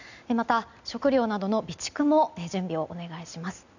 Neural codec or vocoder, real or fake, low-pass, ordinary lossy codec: none; real; 7.2 kHz; none